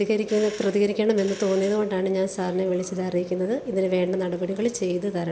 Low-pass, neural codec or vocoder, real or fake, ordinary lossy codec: none; none; real; none